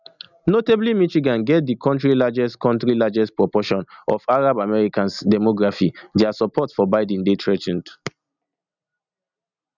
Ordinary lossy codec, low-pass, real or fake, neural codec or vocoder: none; 7.2 kHz; real; none